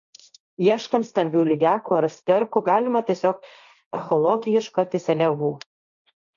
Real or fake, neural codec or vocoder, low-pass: fake; codec, 16 kHz, 1.1 kbps, Voila-Tokenizer; 7.2 kHz